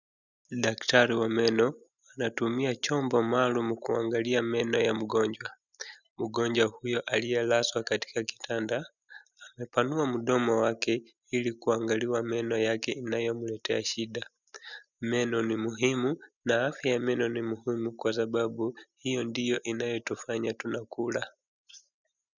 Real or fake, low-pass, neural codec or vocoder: real; 7.2 kHz; none